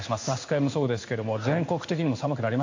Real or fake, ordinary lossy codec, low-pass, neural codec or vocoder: fake; AAC, 48 kbps; 7.2 kHz; codec, 16 kHz in and 24 kHz out, 1 kbps, XY-Tokenizer